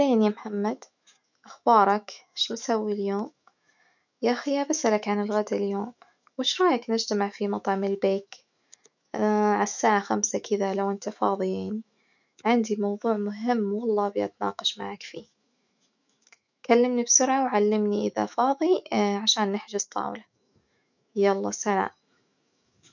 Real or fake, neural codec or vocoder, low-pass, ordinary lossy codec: fake; autoencoder, 48 kHz, 128 numbers a frame, DAC-VAE, trained on Japanese speech; 7.2 kHz; none